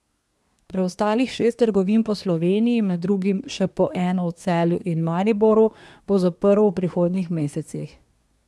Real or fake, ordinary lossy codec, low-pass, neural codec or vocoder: fake; none; none; codec, 24 kHz, 1 kbps, SNAC